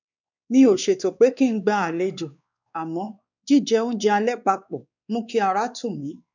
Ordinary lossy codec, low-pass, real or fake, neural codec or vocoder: none; 7.2 kHz; fake; codec, 16 kHz, 2 kbps, X-Codec, WavLM features, trained on Multilingual LibriSpeech